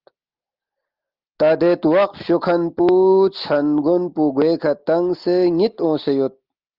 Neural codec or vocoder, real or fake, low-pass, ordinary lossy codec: none; real; 5.4 kHz; Opus, 32 kbps